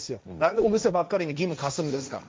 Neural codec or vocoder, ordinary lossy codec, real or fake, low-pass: codec, 16 kHz, 1.1 kbps, Voila-Tokenizer; none; fake; none